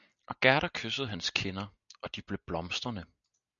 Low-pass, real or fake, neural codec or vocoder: 7.2 kHz; real; none